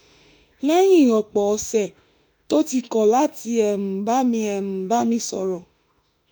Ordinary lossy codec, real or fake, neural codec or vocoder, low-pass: none; fake; autoencoder, 48 kHz, 32 numbers a frame, DAC-VAE, trained on Japanese speech; none